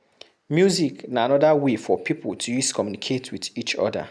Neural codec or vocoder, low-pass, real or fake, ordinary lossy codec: none; none; real; none